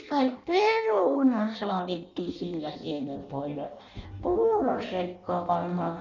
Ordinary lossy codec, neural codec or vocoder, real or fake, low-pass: none; codec, 16 kHz in and 24 kHz out, 0.6 kbps, FireRedTTS-2 codec; fake; 7.2 kHz